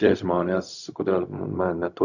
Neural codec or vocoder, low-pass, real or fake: codec, 16 kHz, 0.4 kbps, LongCat-Audio-Codec; 7.2 kHz; fake